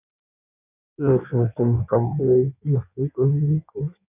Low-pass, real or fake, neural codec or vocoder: 3.6 kHz; fake; codec, 16 kHz in and 24 kHz out, 1.1 kbps, FireRedTTS-2 codec